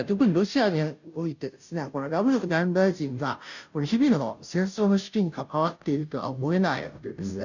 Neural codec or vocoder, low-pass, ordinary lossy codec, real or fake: codec, 16 kHz, 0.5 kbps, FunCodec, trained on Chinese and English, 25 frames a second; 7.2 kHz; none; fake